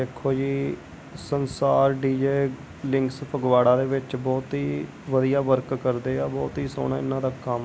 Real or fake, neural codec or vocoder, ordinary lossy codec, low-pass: real; none; none; none